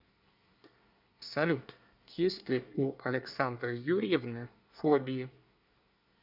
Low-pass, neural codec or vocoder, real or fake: 5.4 kHz; codec, 24 kHz, 1 kbps, SNAC; fake